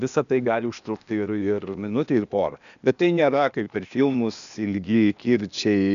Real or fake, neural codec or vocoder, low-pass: fake; codec, 16 kHz, 0.8 kbps, ZipCodec; 7.2 kHz